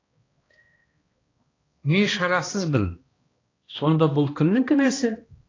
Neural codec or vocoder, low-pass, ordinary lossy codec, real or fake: codec, 16 kHz, 1 kbps, X-Codec, HuBERT features, trained on balanced general audio; 7.2 kHz; AAC, 32 kbps; fake